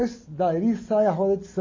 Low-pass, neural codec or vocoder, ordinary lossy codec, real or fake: 7.2 kHz; none; MP3, 32 kbps; real